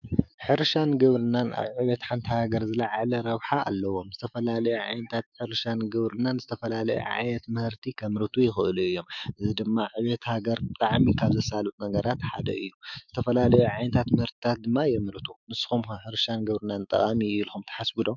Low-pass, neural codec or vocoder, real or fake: 7.2 kHz; autoencoder, 48 kHz, 128 numbers a frame, DAC-VAE, trained on Japanese speech; fake